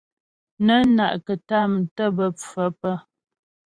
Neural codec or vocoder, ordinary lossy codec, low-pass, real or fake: vocoder, 44.1 kHz, 128 mel bands every 512 samples, BigVGAN v2; Opus, 64 kbps; 9.9 kHz; fake